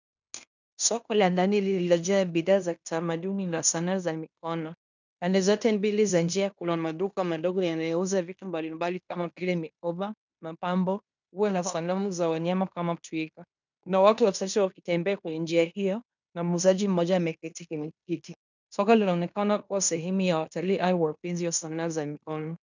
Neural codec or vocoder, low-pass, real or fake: codec, 16 kHz in and 24 kHz out, 0.9 kbps, LongCat-Audio-Codec, fine tuned four codebook decoder; 7.2 kHz; fake